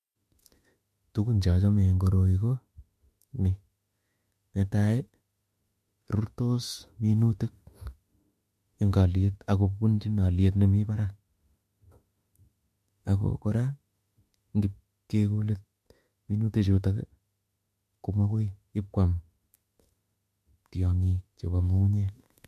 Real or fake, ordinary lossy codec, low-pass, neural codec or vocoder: fake; MP3, 64 kbps; 14.4 kHz; autoencoder, 48 kHz, 32 numbers a frame, DAC-VAE, trained on Japanese speech